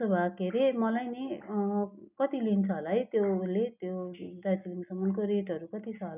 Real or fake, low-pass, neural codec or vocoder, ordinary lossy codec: real; 3.6 kHz; none; none